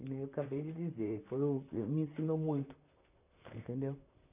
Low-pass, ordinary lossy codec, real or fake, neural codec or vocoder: 3.6 kHz; none; fake; vocoder, 44.1 kHz, 128 mel bands, Pupu-Vocoder